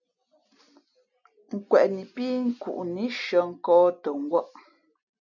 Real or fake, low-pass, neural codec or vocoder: real; 7.2 kHz; none